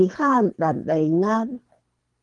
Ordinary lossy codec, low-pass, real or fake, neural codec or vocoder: Opus, 24 kbps; 10.8 kHz; fake; codec, 24 kHz, 3 kbps, HILCodec